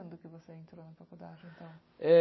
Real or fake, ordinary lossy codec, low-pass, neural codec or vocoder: real; MP3, 24 kbps; 7.2 kHz; none